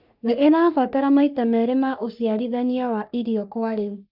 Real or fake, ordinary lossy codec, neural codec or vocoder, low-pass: fake; none; codec, 44.1 kHz, 3.4 kbps, Pupu-Codec; 5.4 kHz